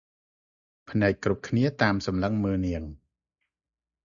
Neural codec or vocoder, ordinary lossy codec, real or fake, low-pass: none; Opus, 64 kbps; real; 7.2 kHz